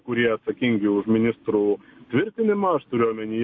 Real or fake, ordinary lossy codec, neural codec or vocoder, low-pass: real; MP3, 32 kbps; none; 7.2 kHz